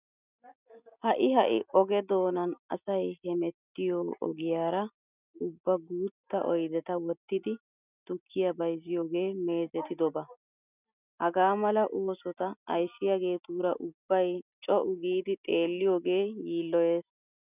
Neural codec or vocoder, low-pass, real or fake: none; 3.6 kHz; real